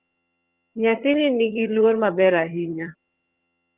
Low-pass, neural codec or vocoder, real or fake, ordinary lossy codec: 3.6 kHz; vocoder, 22.05 kHz, 80 mel bands, HiFi-GAN; fake; Opus, 32 kbps